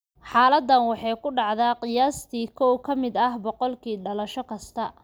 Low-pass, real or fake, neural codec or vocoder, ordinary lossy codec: none; real; none; none